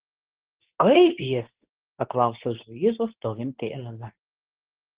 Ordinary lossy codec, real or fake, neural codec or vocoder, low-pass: Opus, 16 kbps; fake; codec, 24 kHz, 0.9 kbps, WavTokenizer, medium speech release version 2; 3.6 kHz